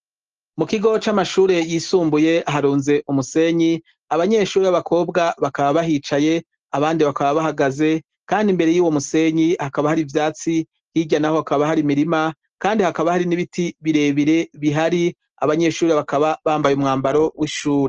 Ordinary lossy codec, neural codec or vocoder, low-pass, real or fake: Opus, 16 kbps; none; 7.2 kHz; real